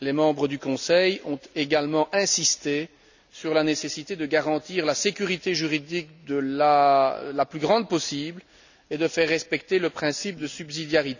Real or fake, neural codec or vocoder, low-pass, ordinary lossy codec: real; none; 7.2 kHz; none